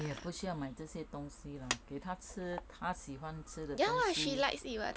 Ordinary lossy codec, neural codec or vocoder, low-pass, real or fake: none; none; none; real